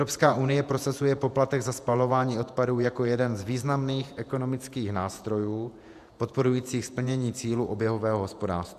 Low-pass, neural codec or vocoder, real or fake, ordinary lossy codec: 14.4 kHz; vocoder, 48 kHz, 128 mel bands, Vocos; fake; AAC, 96 kbps